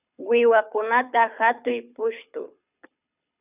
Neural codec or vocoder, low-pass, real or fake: codec, 24 kHz, 6 kbps, HILCodec; 3.6 kHz; fake